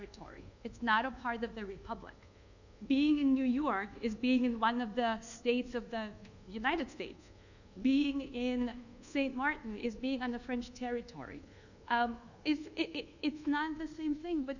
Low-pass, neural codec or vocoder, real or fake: 7.2 kHz; codec, 24 kHz, 1.2 kbps, DualCodec; fake